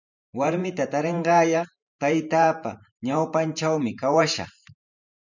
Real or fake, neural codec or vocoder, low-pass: fake; vocoder, 44.1 kHz, 128 mel bands every 512 samples, BigVGAN v2; 7.2 kHz